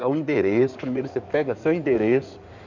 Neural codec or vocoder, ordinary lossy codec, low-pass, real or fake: codec, 16 kHz in and 24 kHz out, 2.2 kbps, FireRedTTS-2 codec; none; 7.2 kHz; fake